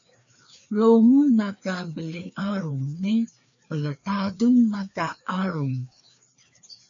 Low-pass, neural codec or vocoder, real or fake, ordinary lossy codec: 7.2 kHz; codec, 16 kHz, 2 kbps, FreqCodec, larger model; fake; MP3, 64 kbps